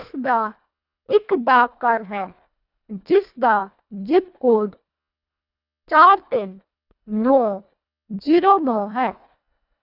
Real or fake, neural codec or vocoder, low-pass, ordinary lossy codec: fake; codec, 24 kHz, 1.5 kbps, HILCodec; 5.4 kHz; none